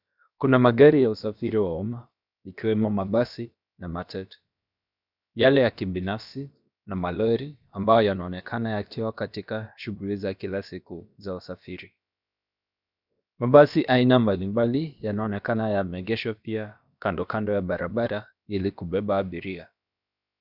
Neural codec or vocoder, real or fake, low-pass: codec, 16 kHz, about 1 kbps, DyCAST, with the encoder's durations; fake; 5.4 kHz